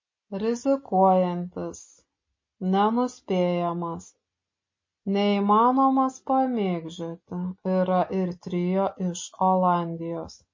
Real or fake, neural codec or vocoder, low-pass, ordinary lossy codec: real; none; 7.2 kHz; MP3, 32 kbps